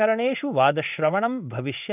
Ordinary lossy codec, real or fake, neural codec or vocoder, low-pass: none; fake; codec, 16 kHz in and 24 kHz out, 1 kbps, XY-Tokenizer; 3.6 kHz